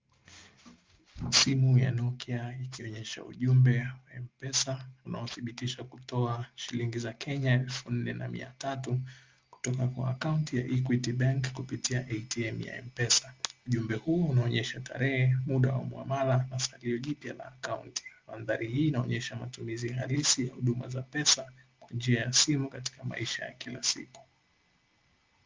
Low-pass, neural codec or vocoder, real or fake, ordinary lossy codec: 7.2 kHz; none; real; Opus, 32 kbps